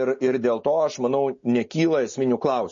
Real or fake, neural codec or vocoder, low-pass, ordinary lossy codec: real; none; 7.2 kHz; MP3, 32 kbps